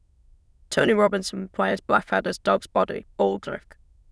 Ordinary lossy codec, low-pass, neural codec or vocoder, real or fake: none; none; autoencoder, 22.05 kHz, a latent of 192 numbers a frame, VITS, trained on many speakers; fake